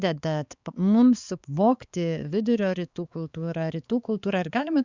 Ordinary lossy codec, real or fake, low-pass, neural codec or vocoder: Opus, 64 kbps; fake; 7.2 kHz; codec, 16 kHz, 4 kbps, X-Codec, HuBERT features, trained on balanced general audio